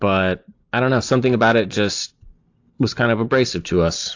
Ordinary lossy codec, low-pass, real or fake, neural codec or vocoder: AAC, 48 kbps; 7.2 kHz; real; none